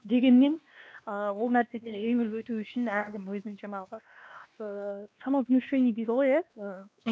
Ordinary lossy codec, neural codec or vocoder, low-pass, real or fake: none; codec, 16 kHz, 2 kbps, X-Codec, WavLM features, trained on Multilingual LibriSpeech; none; fake